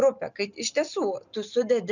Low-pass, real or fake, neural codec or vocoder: 7.2 kHz; real; none